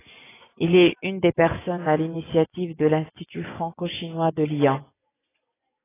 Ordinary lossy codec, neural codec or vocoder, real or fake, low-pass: AAC, 16 kbps; vocoder, 24 kHz, 100 mel bands, Vocos; fake; 3.6 kHz